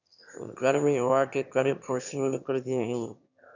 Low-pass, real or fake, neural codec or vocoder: 7.2 kHz; fake; autoencoder, 22.05 kHz, a latent of 192 numbers a frame, VITS, trained on one speaker